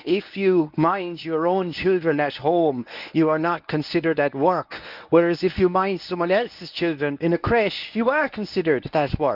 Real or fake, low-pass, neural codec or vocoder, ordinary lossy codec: fake; 5.4 kHz; codec, 24 kHz, 0.9 kbps, WavTokenizer, medium speech release version 1; none